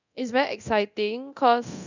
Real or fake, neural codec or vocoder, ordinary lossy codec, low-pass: fake; codec, 24 kHz, 0.9 kbps, DualCodec; none; 7.2 kHz